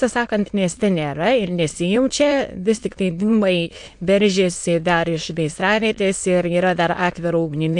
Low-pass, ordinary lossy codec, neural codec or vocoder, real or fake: 9.9 kHz; MP3, 64 kbps; autoencoder, 22.05 kHz, a latent of 192 numbers a frame, VITS, trained on many speakers; fake